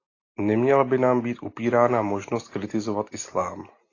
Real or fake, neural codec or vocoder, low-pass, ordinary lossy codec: real; none; 7.2 kHz; AAC, 32 kbps